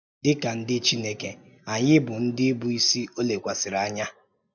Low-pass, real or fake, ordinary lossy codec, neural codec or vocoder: 7.2 kHz; real; none; none